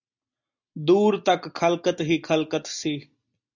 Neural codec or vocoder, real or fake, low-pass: none; real; 7.2 kHz